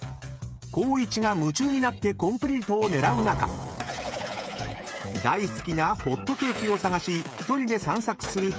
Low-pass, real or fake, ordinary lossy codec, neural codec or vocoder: none; fake; none; codec, 16 kHz, 8 kbps, FreqCodec, smaller model